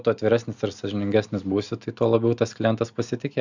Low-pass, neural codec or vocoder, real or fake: 7.2 kHz; none; real